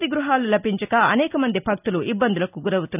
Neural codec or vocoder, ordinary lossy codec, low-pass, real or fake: none; none; 3.6 kHz; real